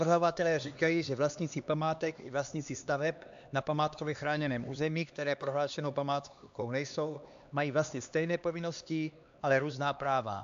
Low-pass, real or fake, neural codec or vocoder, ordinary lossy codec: 7.2 kHz; fake; codec, 16 kHz, 2 kbps, X-Codec, HuBERT features, trained on LibriSpeech; AAC, 64 kbps